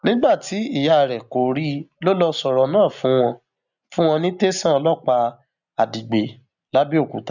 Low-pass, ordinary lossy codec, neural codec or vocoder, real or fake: 7.2 kHz; none; none; real